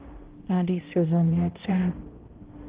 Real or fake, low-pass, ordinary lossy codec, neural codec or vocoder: fake; 3.6 kHz; Opus, 16 kbps; codec, 16 kHz, 0.5 kbps, X-Codec, HuBERT features, trained on balanced general audio